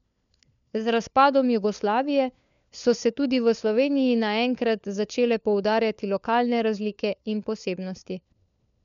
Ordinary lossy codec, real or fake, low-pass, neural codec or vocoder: none; fake; 7.2 kHz; codec, 16 kHz, 4 kbps, FunCodec, trained on LibriTTS, 50 frames a second